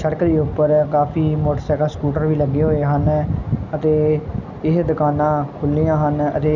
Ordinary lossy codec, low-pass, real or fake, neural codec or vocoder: none; 7.2 kHz; real; none